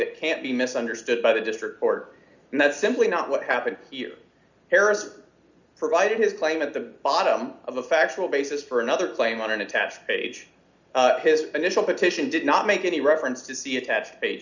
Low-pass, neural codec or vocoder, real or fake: 7.2 kHz; none; real